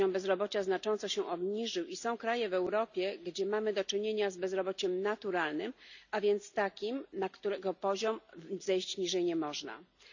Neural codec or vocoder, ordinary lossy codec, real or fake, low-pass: none; none; real; 7.2 kHz